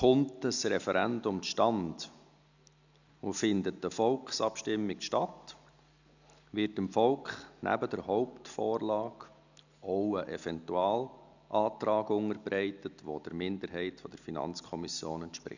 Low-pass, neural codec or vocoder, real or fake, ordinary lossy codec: 7.2 kHz; none; real; none